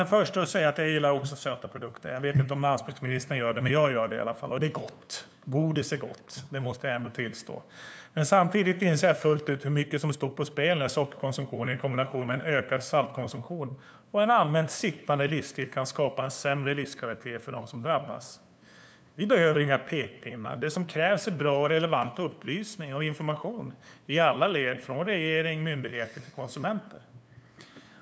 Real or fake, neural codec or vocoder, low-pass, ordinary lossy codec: fake; codec, 16 kHz, 2 kbps, FunCodec, trained on LibriTTS, 25 frames a second; none; none